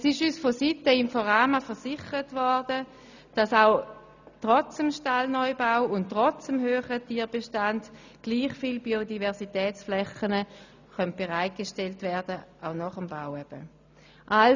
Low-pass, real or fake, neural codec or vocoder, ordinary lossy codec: 7.2 kHz; real; none; none